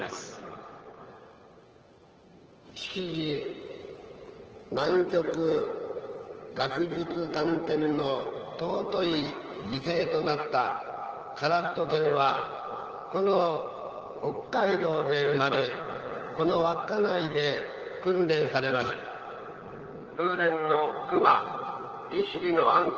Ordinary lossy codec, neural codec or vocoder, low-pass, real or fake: Opus, 16 kbps; codec, 16 kHz, 4 kbps, FunCodec, trained on LibriTTS, 50 frames a second; 7.2 kHz; fake